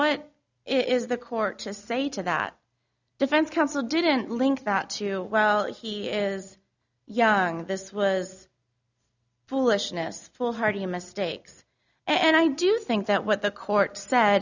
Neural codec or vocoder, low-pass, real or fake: none; 7.2 kHz; real